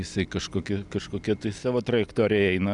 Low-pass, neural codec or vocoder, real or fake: 10.8 kHz; none; real